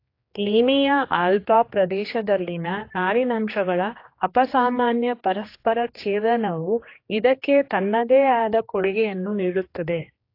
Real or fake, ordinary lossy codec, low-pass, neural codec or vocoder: fake; AAC, 32 kbps; 5.4 kHz; codec, 16 kHz, 2 kbps, X-Codec, HuBERT features, trained on general audio